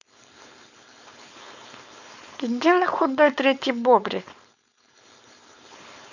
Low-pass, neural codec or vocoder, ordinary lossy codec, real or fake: 7.2 kHz; codec, 16 kHz, 4.8 kbps, FACodec; none; fake